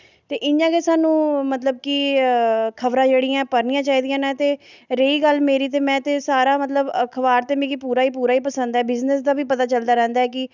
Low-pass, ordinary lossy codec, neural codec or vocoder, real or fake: 7.2 kHz; none; none; real